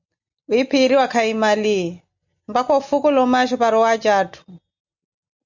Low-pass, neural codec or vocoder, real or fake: 7.2 kHz; none; real